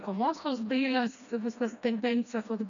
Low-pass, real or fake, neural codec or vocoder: 7.2 kHz; fake; codec, 16 kHz, 1 kbps, FreqCodec, smaller model